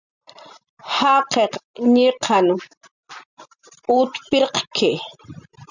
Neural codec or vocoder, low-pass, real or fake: none; 7.2 kHz; real